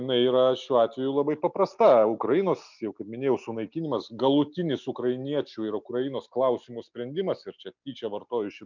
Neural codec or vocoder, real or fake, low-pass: none; real; 7.2 kHz